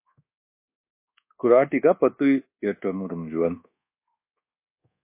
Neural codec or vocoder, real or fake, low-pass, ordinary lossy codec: codec, 24 kHz, 1.2 kbps, DualCodec; fake; 3.6 kHz; MP3, 24 kbps